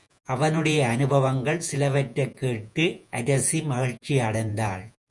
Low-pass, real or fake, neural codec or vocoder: 10.8 kHz; fake; vocoder, 48 kHz, 128 mel bands, Vocos